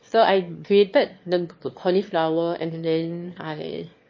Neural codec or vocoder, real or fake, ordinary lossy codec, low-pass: autoencoder, 22.05 kHz, a latent of 192 numbers a frame, VITS, trained on one speaker; fake; MP3, 32 kbps; 7.2 kHz